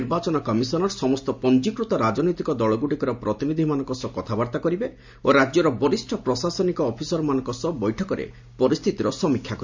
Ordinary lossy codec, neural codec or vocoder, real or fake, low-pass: MP3, 64 kbps; none; real; 7.2 kHz